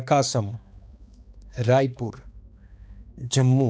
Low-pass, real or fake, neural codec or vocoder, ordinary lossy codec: none; fake; codec, 16 kHz, 2 kbps, X-Codec, HuBERT features, trained on balanced general audio; none